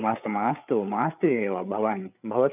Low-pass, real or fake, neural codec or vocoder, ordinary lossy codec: 3.6 kHz; fake; codec, 16 kHz, 8 kbps, FreqCodec, larger model; none